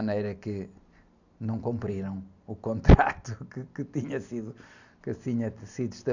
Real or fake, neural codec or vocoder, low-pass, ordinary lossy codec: real; none; 7.2 kHz; none